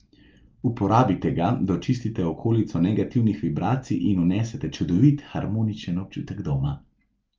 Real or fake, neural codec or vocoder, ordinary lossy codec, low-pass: real; none; Opus, 24 kbps; 7.2 kHz